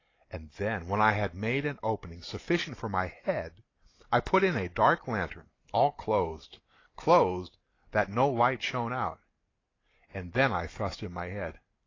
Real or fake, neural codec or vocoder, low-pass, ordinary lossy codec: real; none; 7.2 kHz; AAC, 32 kbps